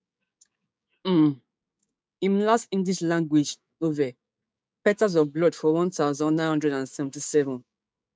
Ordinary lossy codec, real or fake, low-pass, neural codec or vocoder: none; fake; none; codec, 16 kHz, 6 kbps, DAC